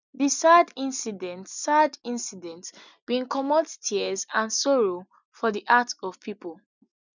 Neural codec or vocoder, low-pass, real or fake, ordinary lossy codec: none; 7.2 kHz; real; none